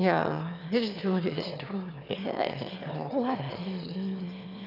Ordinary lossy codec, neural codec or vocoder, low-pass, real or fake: none; autoencoder, 22.05 kHz, a latent of 192 numbers a frame, VITS, trained on one speaker; 5.4 kHz; fake